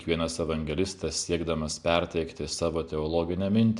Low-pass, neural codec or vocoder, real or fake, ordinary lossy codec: 10.8 kHz; none; real; MP3, 96 kbps